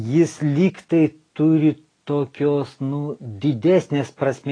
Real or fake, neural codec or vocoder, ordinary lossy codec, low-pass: real; none; AAC, 32 kbps; 9.9 kHz